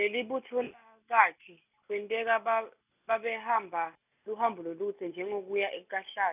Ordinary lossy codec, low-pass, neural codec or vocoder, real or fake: none; 3.6 kHz; none; real